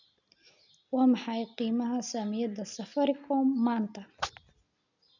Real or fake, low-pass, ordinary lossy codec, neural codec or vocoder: real; 7.2 kHz; none; none